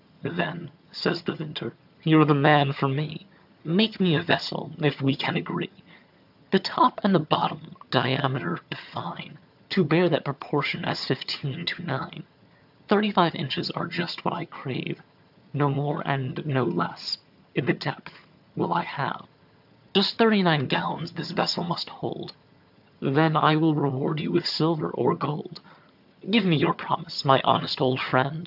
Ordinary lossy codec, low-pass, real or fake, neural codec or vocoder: AAC, 48 kbps; 5.4 kHz; fake; vocoder, 22.05 kHz, 80 mel bands, HiFi-GAN